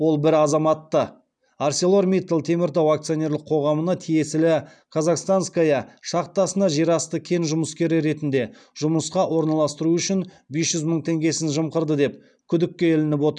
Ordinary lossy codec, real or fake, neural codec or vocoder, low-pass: none; real; none; 9.9 kHz